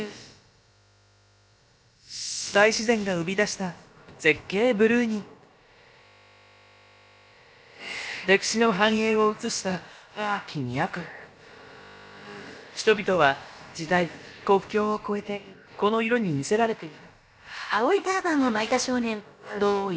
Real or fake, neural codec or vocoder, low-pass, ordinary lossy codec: fake; codec, 16 kHz, about 1 kbps, DyCAST, with the encoder's durations; none; none